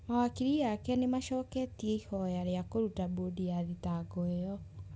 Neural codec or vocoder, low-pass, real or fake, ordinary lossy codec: none; none; real; none